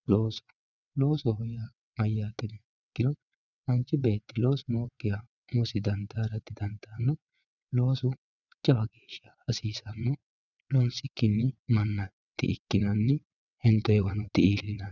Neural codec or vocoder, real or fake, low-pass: vocoder, 22.05 kHz, 80 mel bands, WaveNeXt; fake; 7.2 kHz